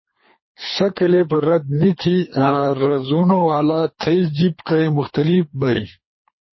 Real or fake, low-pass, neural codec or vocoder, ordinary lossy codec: fake; 7.2 kHz; codec, 24 kHz, 3 kbps, HILCodec; MP3, 24 kbps